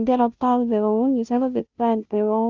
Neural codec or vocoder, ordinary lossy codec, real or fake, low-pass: codec, 16 kHz, 0.5 kbps, FunCodec, trained on Chinese and English, 25 frames a second; Opus, 24 kbps; fake; 7.2 kHz